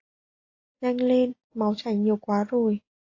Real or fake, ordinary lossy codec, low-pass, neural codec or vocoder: real; AAC, 32 kbps; 7.2 kHz; none